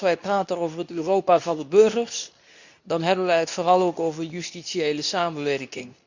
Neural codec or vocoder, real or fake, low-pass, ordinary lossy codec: codec, 24 kHz, 0.9 kbps, WavTokenizer, medium speech release version 2; fake; 7.2 kHz; none